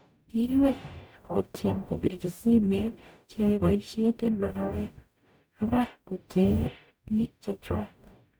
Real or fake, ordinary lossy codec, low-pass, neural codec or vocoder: fake; none; none; codec, 44.1 kHz, 0.9 kbps, DAC